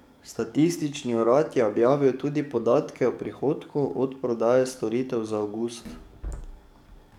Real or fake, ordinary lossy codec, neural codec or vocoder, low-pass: fake; none; codec, 44.1 kHz, 7.8 kbps, DAC; 19.8 kHz